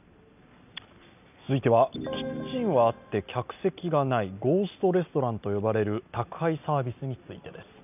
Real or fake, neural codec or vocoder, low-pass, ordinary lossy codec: real; none; 3.6 kHz; none